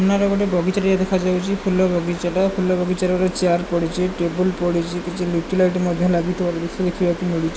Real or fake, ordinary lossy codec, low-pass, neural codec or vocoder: real; none; none; none